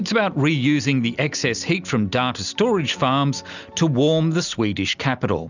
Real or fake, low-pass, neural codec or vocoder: real; 7.2 kHz; none